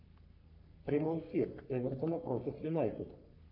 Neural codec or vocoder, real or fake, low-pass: codec, 44.1 kHz, 3.4 kbps, Pupu-Codec; fake; 5.4 kHz